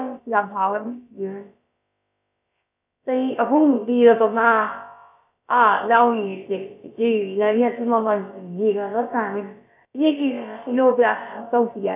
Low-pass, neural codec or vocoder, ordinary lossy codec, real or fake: 3.6 kHz; codec, 16 kHz, about 1 kbps, DyCAST, with the encoder's durations; none; fake